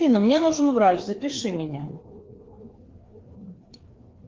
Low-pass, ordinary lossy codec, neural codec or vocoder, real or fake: 7.2 kHz; Opus, 16 kbps; codec, 16 kHz, 2 kbps, FreqCodec, larger model; fake